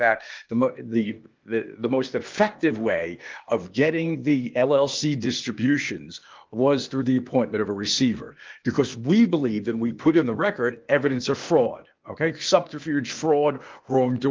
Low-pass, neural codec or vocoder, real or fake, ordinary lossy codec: 7.2 kHz; codec, 16 kHz, 0.8 kbps, ZipCodec; fake; Opus, 32 kbps